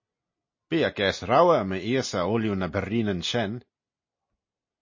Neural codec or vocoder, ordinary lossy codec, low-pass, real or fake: none; MP3, 32 kbps; 7.2 kHz; real